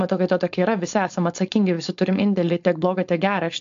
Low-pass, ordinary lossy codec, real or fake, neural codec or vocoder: 7.2 kHz; AAC, 64 kbps; fake; codec, 16 kHz, 4.8 kbps, FACodec